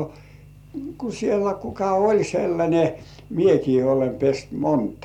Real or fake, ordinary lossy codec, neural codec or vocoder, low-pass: real; none; none; 19.8 kHz